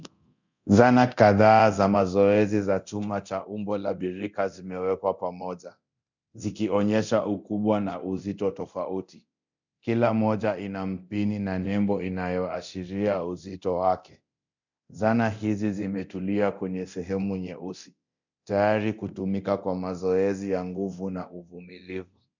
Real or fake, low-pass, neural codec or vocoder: fake; 7.2 kHz; codec, 24 kHz, 0.9 kbps, DualCodec